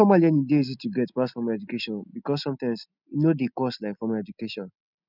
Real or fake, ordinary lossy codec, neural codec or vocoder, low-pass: real; none; none; 5.4 kHz